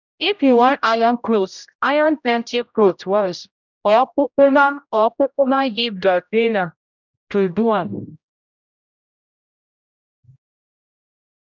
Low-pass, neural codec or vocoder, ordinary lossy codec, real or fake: 7.2 kHz; codec, 16 kHz, 0.5 kbps, X-Codec, HuBERT features, trained on general audio; none; fake